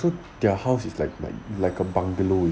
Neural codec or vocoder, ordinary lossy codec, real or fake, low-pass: none; none; real; none